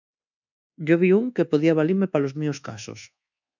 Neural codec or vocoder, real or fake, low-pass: codec, 24 kHz, 1.2 kbps, DualCodec; fake; 7.2 kHz